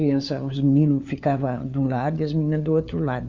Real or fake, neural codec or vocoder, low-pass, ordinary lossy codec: fake; codec, 16 kHz, 4 kbps, FunCodec, trained on LibriTTS, 50 frames a second; 7.2 kHz; Opus, 64 kbps